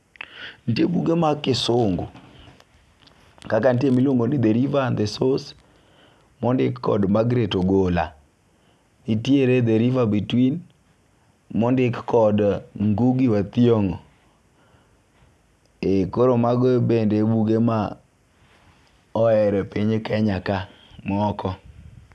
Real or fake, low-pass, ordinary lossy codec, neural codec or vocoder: real; none; none; none